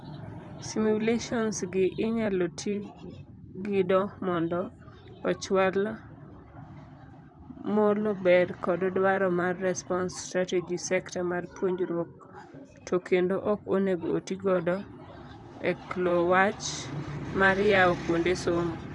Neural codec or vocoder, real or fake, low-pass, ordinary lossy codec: vocoder, 44.1 kHz, 128 mel bands, Pupu-Vocoder; fake; 10.8 kHz; none